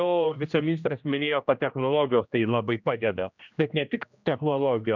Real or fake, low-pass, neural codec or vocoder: fake; 7.2 kHz; codec, 16 kHz, 1 kbps, X-Codec, HuBERT features, trained on general audio